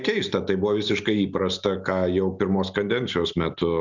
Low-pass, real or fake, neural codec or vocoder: 7.2 kHz; real; none